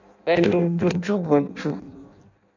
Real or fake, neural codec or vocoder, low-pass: fake; codec, 16 kHz in and 24 kHz out, 0.6 kbps, FireRedTTS-2 codec; 7.2 kHz